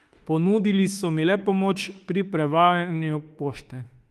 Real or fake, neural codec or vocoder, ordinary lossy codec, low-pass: fake; autoencoder, 48 kHz, 32 numbers a frame, DAC-VAE, trained on Japanese speech; Opus, 24 kbps; 14.4 kHz